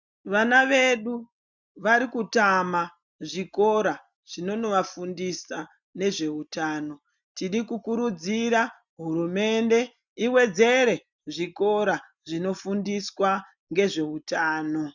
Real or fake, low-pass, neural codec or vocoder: real; 7.2 kHz; none